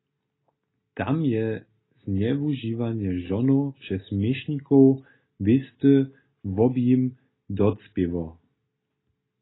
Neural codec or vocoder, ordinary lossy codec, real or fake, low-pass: none; AAC, 16 kbps; real; 7.2 kHz